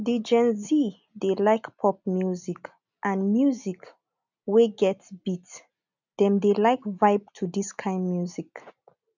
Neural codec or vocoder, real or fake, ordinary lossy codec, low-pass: none; real; none; 7.2 kHz